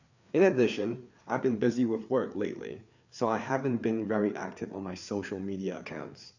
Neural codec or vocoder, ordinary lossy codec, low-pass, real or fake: codec, 16 kHz, 4 kbps, FreqCodec, larger model; none; 7.2 kHz; fake